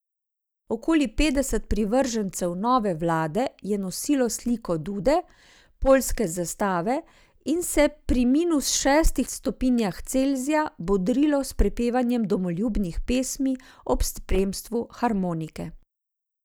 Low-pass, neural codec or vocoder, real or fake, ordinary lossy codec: none; none; real; none